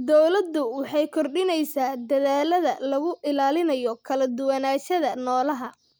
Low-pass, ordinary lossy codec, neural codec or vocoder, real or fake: none; none; none; real